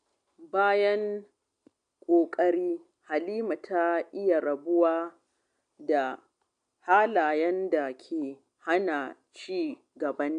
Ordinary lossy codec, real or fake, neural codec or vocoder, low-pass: MP3, 64 kbps; real; none; 9.9 kHz